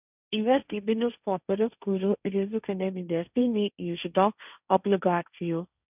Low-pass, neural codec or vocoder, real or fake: 3.6 kHz; codec, 16 kHz, 1.1 kbps, Voila-Tokenizer; fake